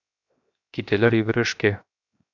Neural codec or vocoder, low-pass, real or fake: codec, 16 kHz, 0.7 kbps, FocalCodec; 7.2 kHz; fake